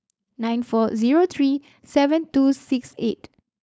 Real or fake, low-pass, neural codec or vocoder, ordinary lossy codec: fake; none; codec, 16 kHz, 4.8 kbps, FACodec; none